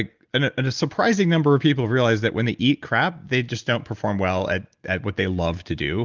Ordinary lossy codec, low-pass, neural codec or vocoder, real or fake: Opus, 32 kbps; 7.2 kHz; none; real